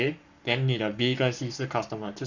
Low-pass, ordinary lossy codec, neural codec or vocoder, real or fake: 7.2 kHz; none; codec, 44.1 kHz, 7.8 kbps, Pupu-Codec; fake